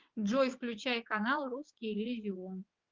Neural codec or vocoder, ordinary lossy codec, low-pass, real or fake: vocoder, 22.05 kHz, 80 mel bands, WaveNeXt; Opus, 32 kbps; 7.2 kHz; fake